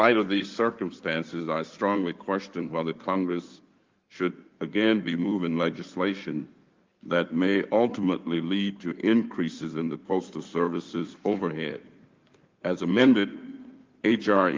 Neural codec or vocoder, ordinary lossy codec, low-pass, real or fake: codec, 16 kHz in and 24 kHz out, 2.2 kbps, FireRedTTS-2 codec; Opus, 24 kbps; 7.2 kHz; fake